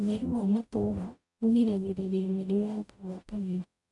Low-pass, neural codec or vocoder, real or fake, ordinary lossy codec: 10.8 kHz; codec, 44.1 kHz, 0.9 kbps, DAC; fake; none